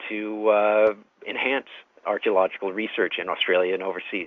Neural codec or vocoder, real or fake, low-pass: none; real; 7.2 kHz